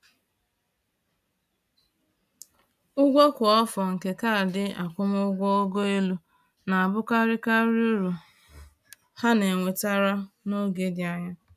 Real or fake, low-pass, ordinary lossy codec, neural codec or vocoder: real; 14.4 kHz; none; none